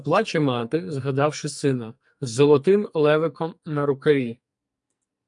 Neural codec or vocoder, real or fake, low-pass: codec, 44.1 kHz, 2.6 kbps, SNAC; fake; 10.8 kHz